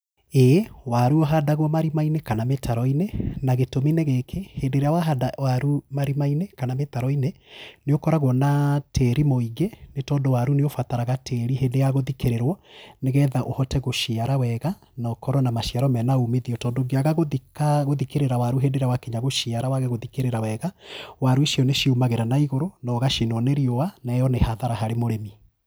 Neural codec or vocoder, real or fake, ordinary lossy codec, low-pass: none; real; none; none